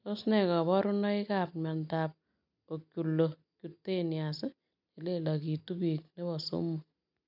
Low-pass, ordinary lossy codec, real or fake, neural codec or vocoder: 5.4 kHz; none; real; none